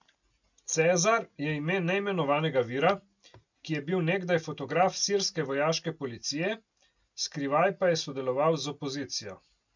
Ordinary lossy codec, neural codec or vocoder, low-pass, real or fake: none; none; 7.2 kHz; real